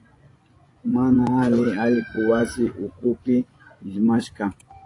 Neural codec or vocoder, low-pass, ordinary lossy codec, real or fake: vocoder, 24 kHz, 100 mel bands, Vocos; 10.8 kHz; AAC, 48 kbps; fake